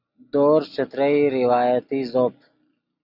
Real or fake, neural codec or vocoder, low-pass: real; none; 5.4 kHz